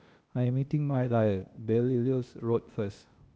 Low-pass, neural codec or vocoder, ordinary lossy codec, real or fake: none; codec, 16 kHz, 0.8 kbps, ZipCodec; none; fake